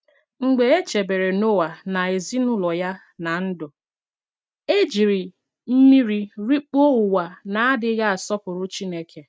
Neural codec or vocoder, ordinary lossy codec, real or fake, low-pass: none; none; real; none